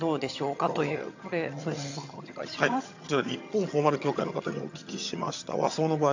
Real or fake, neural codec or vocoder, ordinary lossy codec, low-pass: fake; vocoder, 22.05 kHz, 80 mel bands, HiFi-GAN; AAC, 48 kbps; 7.2 kHz